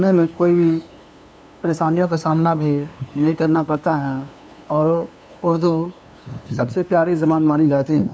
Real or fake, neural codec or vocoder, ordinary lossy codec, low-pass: fake; codec, 16 kHz, 2 kbps, FunCodec, trained on LibriTTS, 25 frames a second; none; none